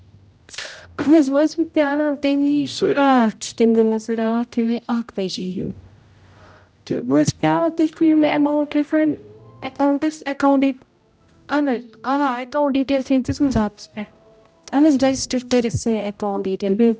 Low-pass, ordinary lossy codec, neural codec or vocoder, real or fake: none; none; codec, 16 kHz, 0.5 kbps, X-Codec, HuBERT features, trained on general audio; fake